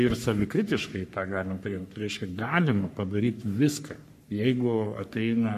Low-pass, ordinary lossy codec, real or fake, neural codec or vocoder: 14.4 kHz; MP3, 64 kbps; fake; codec, 44.1 kHz, 3.4 kbps, Pupu-Codec